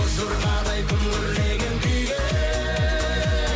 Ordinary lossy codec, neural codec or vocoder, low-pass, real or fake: none; none; none; real